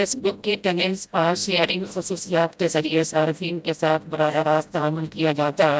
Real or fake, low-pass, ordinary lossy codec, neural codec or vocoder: fake; none; none; codec, 16 kHz, 0.5 kbps, FreqCodec, smaller model